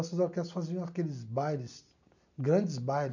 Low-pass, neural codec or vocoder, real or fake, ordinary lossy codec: 7.2 kHz; none; real; none